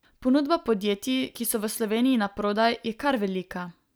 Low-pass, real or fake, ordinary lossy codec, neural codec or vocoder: none; real; none; none